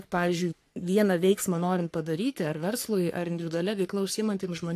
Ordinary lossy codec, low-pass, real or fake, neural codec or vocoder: AAC, 64 kbps; 14.4 kHz; fake; codec, 44.1 kHz, 3.4 kbps, Pupu-Codec